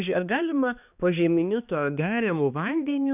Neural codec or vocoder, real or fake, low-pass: codec, 16 kHz, 2 kbps, X-Codec, HuBERT features, trained on balanced general audio; fake; 3.6 kHz